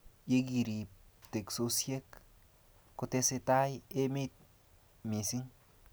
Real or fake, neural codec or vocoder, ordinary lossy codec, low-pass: real; none; none; none